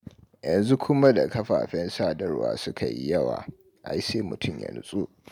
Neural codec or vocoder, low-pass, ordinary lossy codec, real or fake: none; 19.8 kHz; MP3, 96 kbps; real